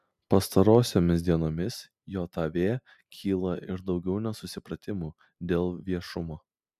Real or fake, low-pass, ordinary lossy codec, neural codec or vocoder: real; 14.4 kHz; MP3, 96 kbps; none